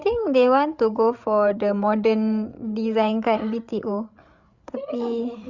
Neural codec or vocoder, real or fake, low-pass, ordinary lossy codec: codec, 16 kHz, 8 kbps, FreqCodec, larger model; fake; 7.2 kHz; Opus, 64 kbps